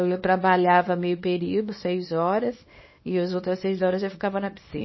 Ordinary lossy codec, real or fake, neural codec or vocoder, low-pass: MP3, 24 kbps; fake; codec, 24 kHz, 0.9 kbps, WavTokenizer, small release; 7.2 kHz